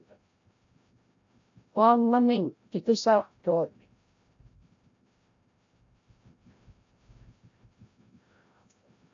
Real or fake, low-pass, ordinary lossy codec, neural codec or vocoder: fake; 7.2 kHz; AAC, 48 kbps; codec, 16 kHz, 0.5 kbps, FreqCodec, larger model